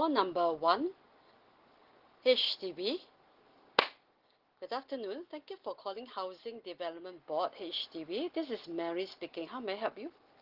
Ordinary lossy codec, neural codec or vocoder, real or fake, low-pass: Opus, 16 kbps; none; real; 5.4 kHz